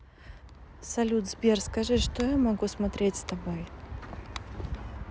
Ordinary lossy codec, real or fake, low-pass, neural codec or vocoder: none; real; none; none